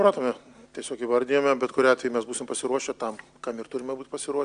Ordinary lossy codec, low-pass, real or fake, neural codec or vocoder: Opus, 64 kbps; 9.9 kHz; real; none